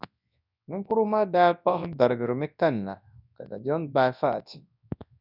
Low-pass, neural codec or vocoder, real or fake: 5.4 kHz; codec, 24 kHz, 0.9 kbps, WavTokenizer, large speech release; fake